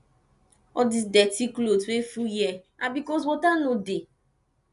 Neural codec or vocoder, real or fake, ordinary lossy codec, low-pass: none; real; none; 10.8 kHz